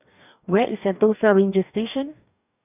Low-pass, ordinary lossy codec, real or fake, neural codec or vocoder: 3.6 kHz; none; fake; codec, 44.1 kHz, 2.6 kbps, DAC